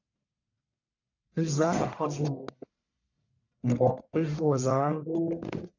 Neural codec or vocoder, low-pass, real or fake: codec, 44.1 kHz, 1.7 kbps, Pupu-Codec; 7.2 kHz; fake